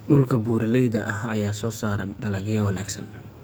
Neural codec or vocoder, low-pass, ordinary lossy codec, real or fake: codec, 44.1 kHz, 2.6 kbps, SNAC; none; none; fake